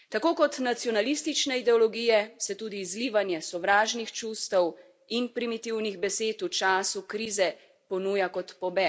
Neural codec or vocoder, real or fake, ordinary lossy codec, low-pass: none; real; none; none